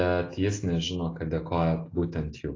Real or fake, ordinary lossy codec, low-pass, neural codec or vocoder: real; AAC, 32 kbps; 7.2 kHz; none